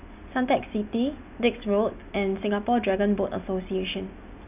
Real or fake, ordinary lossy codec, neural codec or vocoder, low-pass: real; none; none; 3.6 kHz